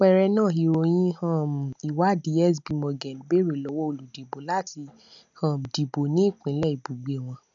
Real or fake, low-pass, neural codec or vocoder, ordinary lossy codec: real; 7.2 kHz; none; none